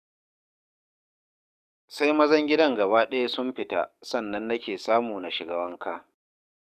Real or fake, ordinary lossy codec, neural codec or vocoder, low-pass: fake; none; codec, 44.1 kHz, 7.8 kbps, DAC; 14.4 kHz